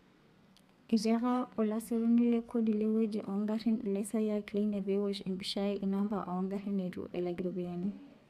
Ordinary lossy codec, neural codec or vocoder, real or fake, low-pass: none; codec, 32 kHz, 1.9 kbps, SNAC; fake; 14.4 kHz